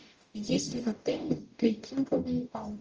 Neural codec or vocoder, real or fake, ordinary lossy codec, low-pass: codec, 44.1 kHz, 0.9 kbps, DAC; fake; Opus, 24 kbps; 7.2 kHz